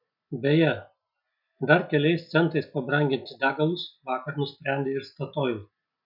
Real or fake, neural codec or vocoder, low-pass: real; none; 5.4 kHz